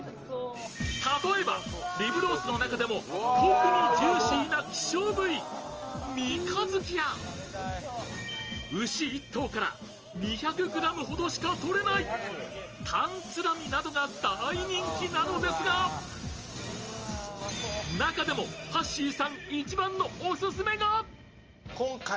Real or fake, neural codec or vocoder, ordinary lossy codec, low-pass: real; none; Opus, 24 kbps; 7.2 kHz